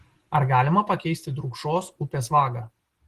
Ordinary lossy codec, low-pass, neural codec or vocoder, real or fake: Opus, 16 kbps; 14.4 kHz; none; real